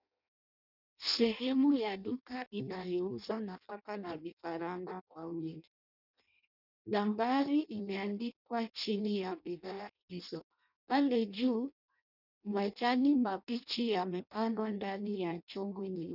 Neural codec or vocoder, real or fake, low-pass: codec, 16 kHz in and 24 kHz out, 0.6 kbps, FireRedTTS-2 codec; fake; 5.4 kHz